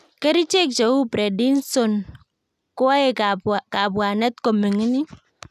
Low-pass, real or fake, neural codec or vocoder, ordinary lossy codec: 14.4 kHz; real; none; none